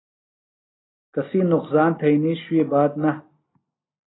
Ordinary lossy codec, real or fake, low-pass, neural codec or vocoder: AAC, 16 kbps; real; 7.2 kHz; none